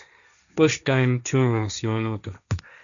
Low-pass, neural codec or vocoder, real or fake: 7.2 kHz; codec, 16 kHz, 1.1 kbps, Voila-Tokenizer; fake